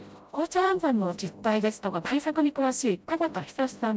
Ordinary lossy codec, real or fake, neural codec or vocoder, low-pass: none; fake; codec, 16 kHz, 0.5 kbps, FreqCodec, smaller model; none